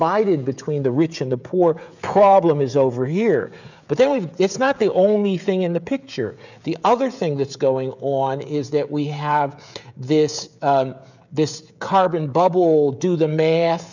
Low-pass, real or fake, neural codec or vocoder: 7.2 kHz; fake; codec, 16 kHz, 16 kbps, FreqCodec, smaller model